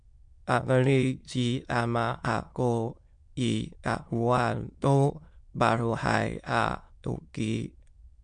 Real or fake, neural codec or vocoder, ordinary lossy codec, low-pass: fake; autoencoder, 22.05 kHz, a latent of 192 numbers a frame, VITS, trained on many speakers; MP3, 64 kbps; 9.9 kHz